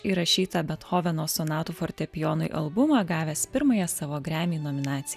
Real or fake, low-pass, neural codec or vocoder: real; 14.4 kHz; none